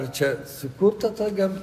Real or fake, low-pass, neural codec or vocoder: real; 14.4 kHz; none